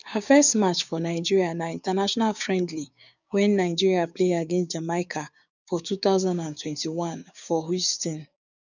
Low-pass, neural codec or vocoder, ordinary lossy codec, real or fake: 7.2 kHz; codec, 16 kHz, 6 kbps, DAC; none; fake